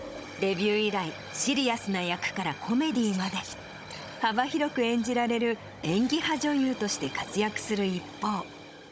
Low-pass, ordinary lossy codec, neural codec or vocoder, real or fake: none; none; codec, 16 kHz, 16 kbps, FunCodec, trained on Chinese and English, 50 frames a second; fake